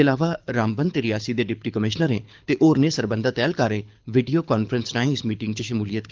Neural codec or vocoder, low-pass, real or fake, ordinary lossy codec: codec, 24 kHz, 6 kbps, HILCodec; 7.2 kHz; fake; Opus, 24 kbps